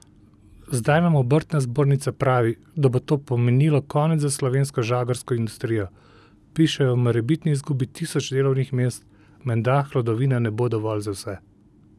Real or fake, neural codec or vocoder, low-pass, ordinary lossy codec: real; none; none; none